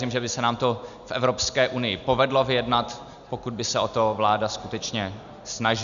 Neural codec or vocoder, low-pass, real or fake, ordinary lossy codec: none; 7.2 kHz; real; MP3, 96 kbps